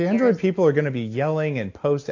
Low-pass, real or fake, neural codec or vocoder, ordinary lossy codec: 7.2 kHz; real; none; AAC, 48 kbps